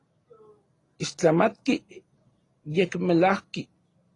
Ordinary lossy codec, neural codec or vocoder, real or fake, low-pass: AAC, 32 kbps; none; real; 10.8 kHz